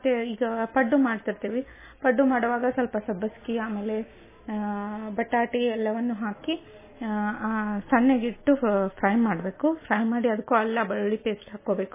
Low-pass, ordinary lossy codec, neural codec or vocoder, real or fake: 3.6 kHz; MP3, 16 kbps; none; real